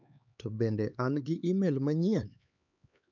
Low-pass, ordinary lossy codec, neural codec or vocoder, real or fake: 7.2 kHz; none; codec, 16 kHz, 4 kbps, X-Codec, HuBERT features, trained on LibriSpeech; fake